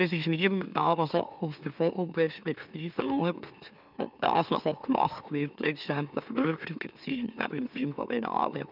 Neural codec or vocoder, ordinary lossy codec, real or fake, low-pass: autoencoder, 44.1 kHz, a latent of 192 numbers a frame, MeloTTS; none; fake; 5.4 kHz